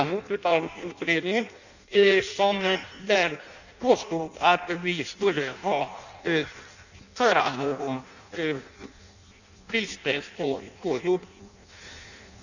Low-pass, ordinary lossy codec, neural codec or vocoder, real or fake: 7.2 kHz; none; codec, 16 kHz in and 24 kHz out, 0.6 kbps, FireRedTTS-2 codec; fake